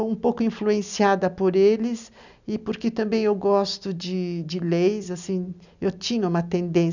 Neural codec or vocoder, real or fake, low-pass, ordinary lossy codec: none; real; 7.2 kHz; none